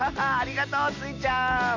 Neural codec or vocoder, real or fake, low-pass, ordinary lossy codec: none; real; 7.2 kHz; none